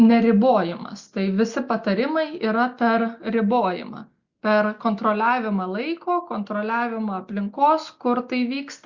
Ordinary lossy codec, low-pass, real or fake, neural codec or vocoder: Opus, 64 kbps; 7.2 kHz; real; none